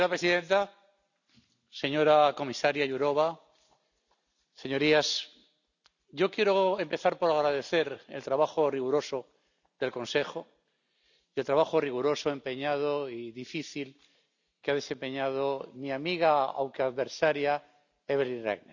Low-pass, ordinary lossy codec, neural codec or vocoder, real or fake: 7.2 kHz; none; none; real